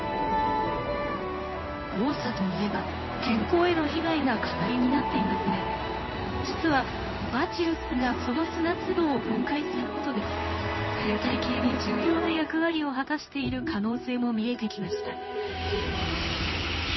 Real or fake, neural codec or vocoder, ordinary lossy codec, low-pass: fake; codec, 16 kHz in and 24 kHz out, 1 kbps, XY-Tokenizer; MP3, 24 kbps; 7.2 kHz